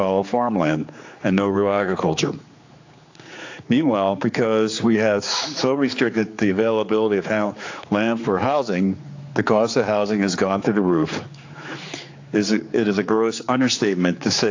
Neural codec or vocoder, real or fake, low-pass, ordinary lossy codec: codec, 16 kHz, 4 kbps, X-Codec, HuBERT features, trained on general audio; fake; 7.2 kHz; AAC, 48 kbps